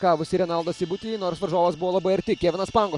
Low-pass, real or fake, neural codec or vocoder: 10.8 kHz; real; none